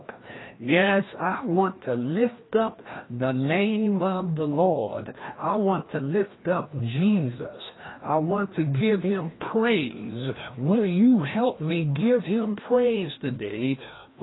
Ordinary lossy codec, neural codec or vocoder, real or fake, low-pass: AAC, 16 kbps; codec, 16 kHz, 1 kbps, FreqCodec, larger model; fake; 7.2 kHz